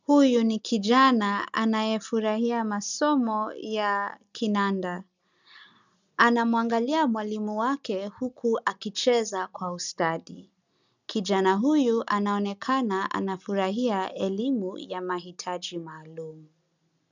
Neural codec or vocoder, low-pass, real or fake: none; 7.2 kHz; real